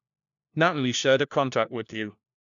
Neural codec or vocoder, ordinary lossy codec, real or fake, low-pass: codec, 16 kHz, 1 kbps, FunCodec, trained on LibriTTS, 50 frames a second; none; fake; 7.2 kHz